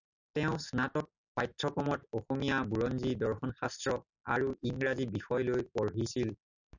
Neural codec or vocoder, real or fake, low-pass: none; real; 7.2 kHz